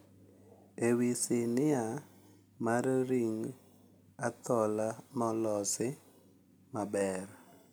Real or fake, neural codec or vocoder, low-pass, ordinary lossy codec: real; none; none; none